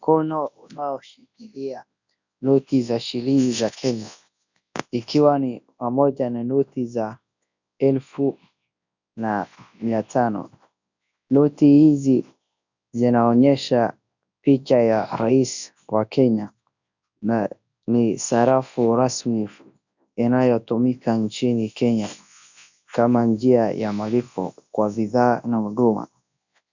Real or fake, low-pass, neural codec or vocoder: fake; 7.2 kHz; codec, 24 kHz, 0.9 kbps, WavTokenizer, large speech release